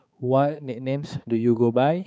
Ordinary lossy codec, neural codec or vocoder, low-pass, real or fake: none; codec, 16 kHz, 4 kbps, X-Codec, HuBERT features, trained on balanced general audio; none; fake